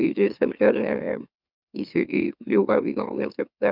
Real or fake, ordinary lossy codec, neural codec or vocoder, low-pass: fake; none; autoencoder, 44.1 kHz, a latent of 192 numbers a frame, MeloTTS; 5.4 kHz